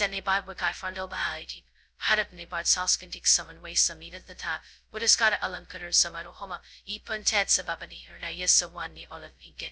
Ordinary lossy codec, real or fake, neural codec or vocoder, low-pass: none; fake; codec, 16 kHz, 0.2 kbps, FocalCodec; none